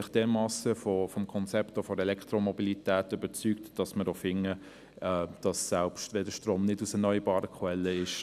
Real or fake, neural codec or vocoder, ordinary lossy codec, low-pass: real; none; none; 14.4 kHz